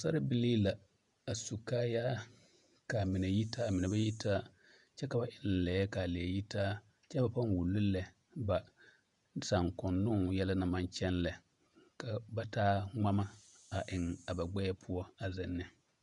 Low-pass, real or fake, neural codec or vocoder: 10.8 kHz; fake; vocoder, 48 kHz, 128 mel bands, Vocos